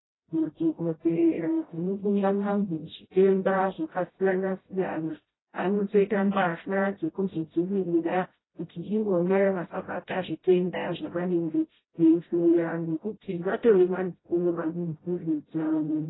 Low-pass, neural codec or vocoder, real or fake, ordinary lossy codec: 7.2 kHz; codec, 16 kHz, 0.5 kbps, FreqCodec, smaller model; fake; AAC, 16 kbps